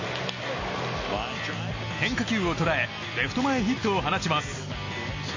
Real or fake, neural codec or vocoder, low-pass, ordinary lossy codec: real; none; 7.2 kHz; MP3, 32 kbps